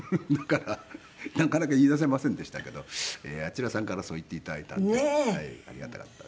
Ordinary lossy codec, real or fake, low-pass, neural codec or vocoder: none; real; none; none